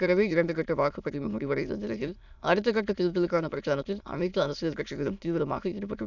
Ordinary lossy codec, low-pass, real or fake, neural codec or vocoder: none; 7.2 kHz; fake; autoencoder, 22.05 kHz, a latent of 192 numbers a frame, VITS, trained on many speakers